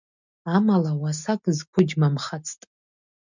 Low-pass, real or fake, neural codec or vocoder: 7.2 kHz; real; none